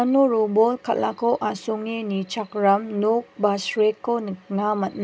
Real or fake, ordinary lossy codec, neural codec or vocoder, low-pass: real; none; none; none